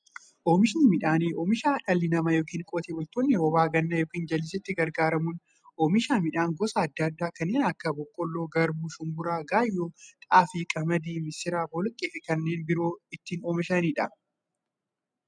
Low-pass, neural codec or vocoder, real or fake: 9.9 kHz; none; real